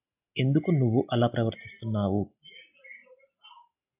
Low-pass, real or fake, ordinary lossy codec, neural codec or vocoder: 3.6 kHz; real; AAC, 32 kbps; none